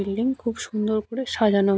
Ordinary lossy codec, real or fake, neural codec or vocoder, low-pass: none; real; none; none